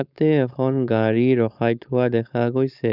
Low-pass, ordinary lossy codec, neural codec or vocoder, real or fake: 5.4 kHz; none; codec, 16 kHz, 4.8 kbps, FACodec; fake